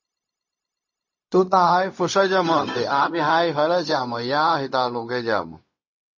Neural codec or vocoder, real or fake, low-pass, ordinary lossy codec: codec, 16 kHz, 0.4 kbps, LongCat-Audio-Codec; fake; 7.2 kHz; MP3, 32 kbps